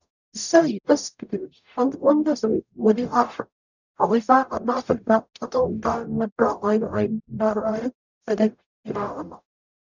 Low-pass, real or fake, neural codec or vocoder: 7.2 kHz; fake; codec, 44.1 kHz, 0.9 kbps, DAC